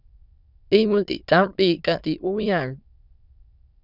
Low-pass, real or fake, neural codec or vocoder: 5.4 kHz; fake; autoencoder, 22.05 kHz, a latent of 192 numbers a frame, VITS, trained on many speakers